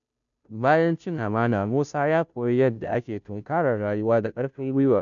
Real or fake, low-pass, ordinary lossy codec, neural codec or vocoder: fake; 7.2 kHz; none; codec, 16 kHz, 0.5 kbps, FunCodec, trained on Chinese and English, 25 frames a second